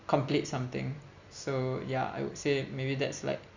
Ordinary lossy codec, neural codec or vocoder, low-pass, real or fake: Opus, 64 kbps; none; 7.2 kHz; real